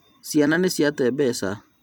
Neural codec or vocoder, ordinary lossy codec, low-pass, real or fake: vocoder, 44.1 kHz, 128 mel bands every 256 samples, BigVGAN v2; none; none; fake